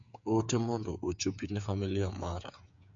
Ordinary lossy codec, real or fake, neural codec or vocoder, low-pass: MP3, 48 kbps; fake; codec, 16 kHz, 8 kbps, FreqCodec, smaller model; 7.2 kHz